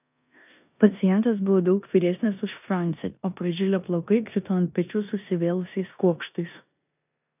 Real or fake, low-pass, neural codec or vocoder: fake; 3.6 kHz; codec, 16 kHz in and 24 kHz out, 0.9 kbps, LongCat-Audio-Codec, four codebook decoder